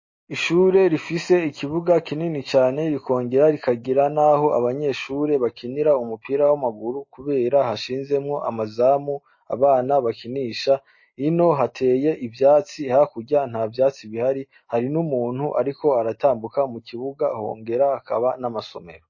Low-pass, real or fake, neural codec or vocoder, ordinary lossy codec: 7.2 kHz; real; none; MP3, 32 kbps